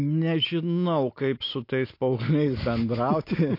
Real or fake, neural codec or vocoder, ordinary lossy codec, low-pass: real; none; AAC, 32 kbps; 5.4 kHz